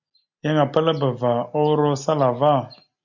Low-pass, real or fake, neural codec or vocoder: 7.2 kHz; real; none